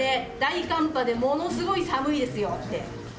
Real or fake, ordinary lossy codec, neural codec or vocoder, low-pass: real; none; none; none